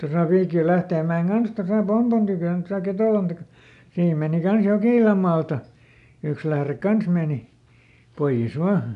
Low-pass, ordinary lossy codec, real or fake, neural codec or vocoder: 10.8 kHz; none; real; none